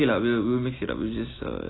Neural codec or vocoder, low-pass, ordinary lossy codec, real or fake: none; 7.2 kHz; AAC, 16 kbps; real